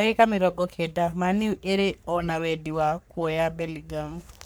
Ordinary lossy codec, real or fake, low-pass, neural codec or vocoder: none; fake; none; codec, 44.1 kHz, 3.4 kbps, Pupu-Codec